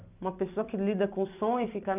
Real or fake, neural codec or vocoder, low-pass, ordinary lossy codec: fake; vocoder, 44.1 kHz, 128 mel bands every 256 samples, BigVGAN v2; 3.6 kHz; none